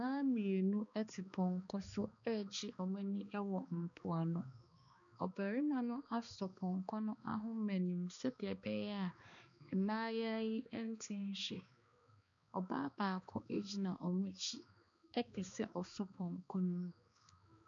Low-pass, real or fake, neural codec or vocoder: 7.2 kHz; fake; codec, 16 kHz, 2 kbps, X-Codec, HuBERT features, trained on balanced general audio